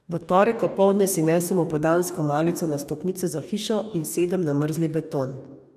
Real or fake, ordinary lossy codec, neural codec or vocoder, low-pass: fake; none; codec, 44.1 kHz, 2.6 kbps, DAC; 14.4 kHz